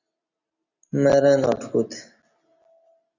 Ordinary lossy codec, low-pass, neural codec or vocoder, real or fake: Opus, 64 kbps; 7.2 kHz; none; real